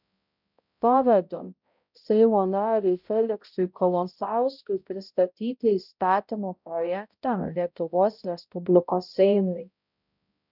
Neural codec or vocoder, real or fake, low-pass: codec, 16 kHz, 0.5 kbps, X-Codec, HuBERT features, trained on balanced general audio; fake; 5.4 kHz